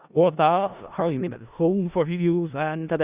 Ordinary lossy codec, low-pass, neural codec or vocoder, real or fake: Opus, 64 kbps; 3.6 kHz; codec, 16 kHz in and 24 kHz out, 0.4 kbps, LongCat-Audio-Codec, four codebook decoder; fake